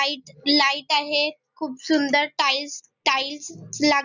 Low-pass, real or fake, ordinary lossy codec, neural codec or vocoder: 7.2 kHz; real; none; none